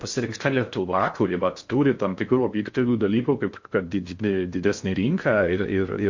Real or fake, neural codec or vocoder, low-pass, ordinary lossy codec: fake; codec, 16 kHz in and 24 kHz out, 0.6 kbps, FocalCodec, streaming, 4096 codes; 7.2 kHz; MP3, 48 kbps